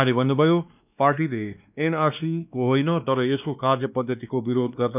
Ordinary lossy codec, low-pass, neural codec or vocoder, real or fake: none; 3.6 kHz; codec, 16 kHz, 2 kbps, X-Codec, WavLM features, trained on Multilingual LibriSpeech; fake